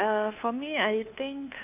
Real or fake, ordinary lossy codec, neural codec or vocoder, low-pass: fake; none; codec, 16 kHz, 2 kbps, FunCodec, trained on Chinese and English, 25 frames a second; 3.6 kHz